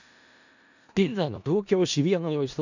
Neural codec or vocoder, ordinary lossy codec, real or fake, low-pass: codec, 16 kHz in and 24 kHz out, 0.4 kbps, LongCat-Audio-Codec, four codebook decoder; none; fake; 7.2 kHz